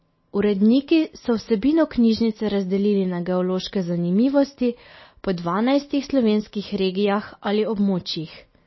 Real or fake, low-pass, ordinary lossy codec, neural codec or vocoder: real; 7.2 kHz; MP3, 24 kbps; none